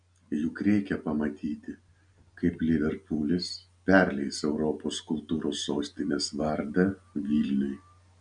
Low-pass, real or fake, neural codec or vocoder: 9.9 kHz; real; none